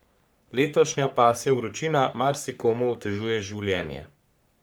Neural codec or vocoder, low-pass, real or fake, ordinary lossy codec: codec, 44.1 kHz, 3.4 kbps, Pupu-Codec; none; fake; none